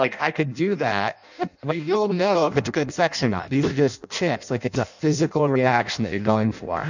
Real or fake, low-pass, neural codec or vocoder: fake; 7.2 kHz; codec, 16 kHz in and 24 kHz out, 0.6 kbps, FireRedTTS-2 codec